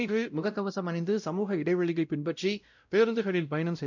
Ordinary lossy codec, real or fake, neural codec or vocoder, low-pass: none; fake; codec, 16 kHz, 0.5 kbps, X-Codec, WavLM features, trained on Multilingual LibriSpeech; 7.2 kHz